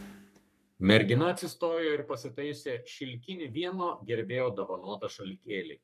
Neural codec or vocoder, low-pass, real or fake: codec, 44.1 kHz, 3.4 kbps, Pupu-Codec; 14.4 kHz; fake